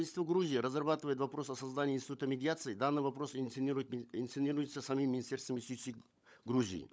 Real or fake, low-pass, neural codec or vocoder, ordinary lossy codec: fake; none; codec, 16 kHz, 16 kbps, FunCodec, trained on LibriTTS, 50 frames a second; none